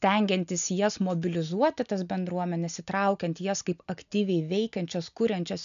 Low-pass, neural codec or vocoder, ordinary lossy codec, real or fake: 7.2 kHz; none; MP3, 96 kbps; real